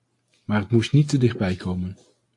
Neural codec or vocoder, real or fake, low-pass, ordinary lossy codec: none; real; 10.8 kHz; AAC, 48 kbps